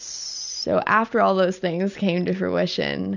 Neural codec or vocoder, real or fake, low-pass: none; real; 7.2 kHz